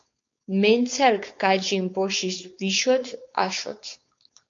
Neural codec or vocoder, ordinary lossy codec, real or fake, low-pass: codec, 16 kHz, 4.8 kbps, FACodec; MP3, 48 kbps; fake; 7.2 kHz